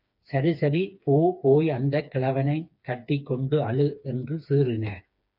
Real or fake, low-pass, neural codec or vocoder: fake; 5.4 kHz; codec, 16 kHz, 4 kbps, FreqCodec, smaller model